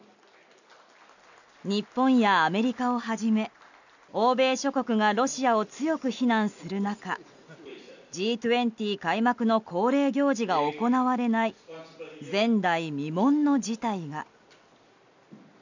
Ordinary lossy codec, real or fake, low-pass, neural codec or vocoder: none; real; 7.2 kHz; none